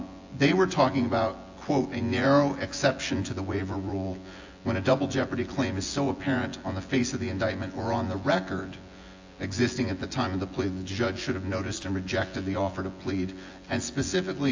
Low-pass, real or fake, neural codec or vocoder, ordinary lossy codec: 7.2 kHz; fake; vocoder, 24 kHz, 100 mel bands, Vocos; AAC, 48 kbps